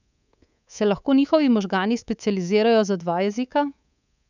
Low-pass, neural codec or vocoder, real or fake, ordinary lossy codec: 7.2 kHz; codec, 24 kHz, 3.1 kbps, DualCodec; fake; none